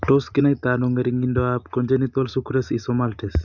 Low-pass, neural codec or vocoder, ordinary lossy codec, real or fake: 7.2 kHz; none; none; real